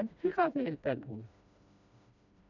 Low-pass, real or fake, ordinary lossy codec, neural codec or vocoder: 7.2 kHz; fake; none; codec, 16 kHz, 1 kbps, FreqCodec, smaller model